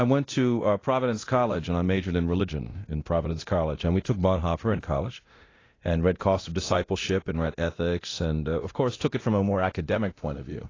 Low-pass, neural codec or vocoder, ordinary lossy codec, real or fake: 7.2 kHz; codec, 24 kHz, 0.9 kbps, DualCodec; AAC, 32 kbps; fake